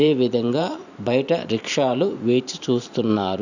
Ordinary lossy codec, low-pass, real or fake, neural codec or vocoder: none; 7.2 kHz; fake; vocoder, 44.1 kHz, 128 mel bands every 512 samples, BigVGAN v2